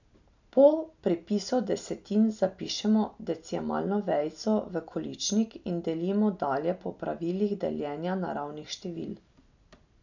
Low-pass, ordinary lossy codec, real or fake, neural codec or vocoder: 7.2 kHz; none; real; none